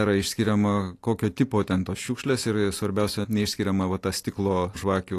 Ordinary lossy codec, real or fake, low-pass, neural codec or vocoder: AAC, 48 kbps; real; 14.4 kHz; none